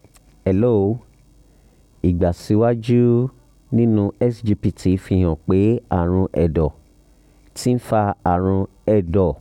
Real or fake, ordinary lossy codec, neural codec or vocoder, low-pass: real; none; none; 19.8 kHz